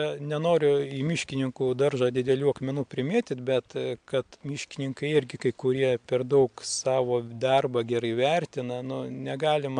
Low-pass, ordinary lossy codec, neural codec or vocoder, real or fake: 9.9 kHz; MP3, 64 kbps; none; real